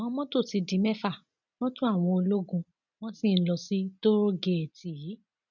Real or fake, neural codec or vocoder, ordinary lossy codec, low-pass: real; none; none; 7.2 kHz